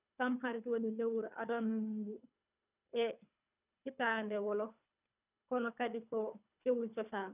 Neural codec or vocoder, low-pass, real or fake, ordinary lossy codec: codec, 24 kHz, 3 kbps, HILCodec; 3.6 kHz; fake; none